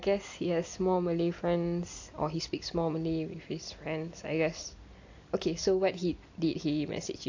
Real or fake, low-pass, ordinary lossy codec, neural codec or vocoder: real; 7.2 kHz; AAC, 48 kbps; none